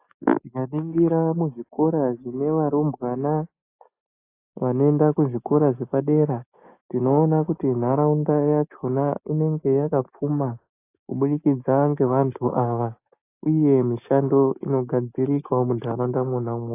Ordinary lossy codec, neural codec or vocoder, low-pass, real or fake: AAC, 24 kbps; none; 3.6 kHz; real